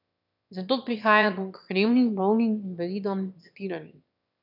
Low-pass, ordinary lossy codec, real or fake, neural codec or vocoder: 5.4 kHz; none; fake; autoencoder, 22.05 kHz, a latent of 192 numbers a frame, VITS, trained on one speaker